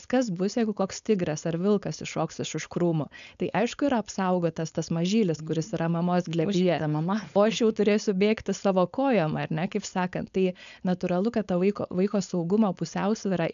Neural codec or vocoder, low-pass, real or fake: codec, 16 kHz, 4.8 kbps, FACodec; 7.2 kHz; fake